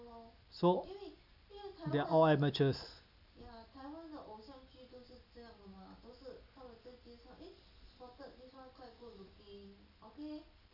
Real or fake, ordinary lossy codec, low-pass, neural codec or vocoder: real; none; 5.4 kHz; none